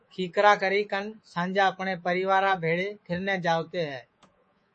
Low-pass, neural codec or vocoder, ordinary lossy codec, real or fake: 10.8 kHz; codec, 24 kHz, 3.1 kbps, DualCodec; MP3, 32 kbps; fake